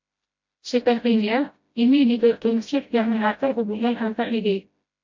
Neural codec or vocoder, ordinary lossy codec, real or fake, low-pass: codec, 16 kHz, 0.5 kbps, FreqCodec, smaller model; MP3, 48 kbps; fake; 7.2 kHz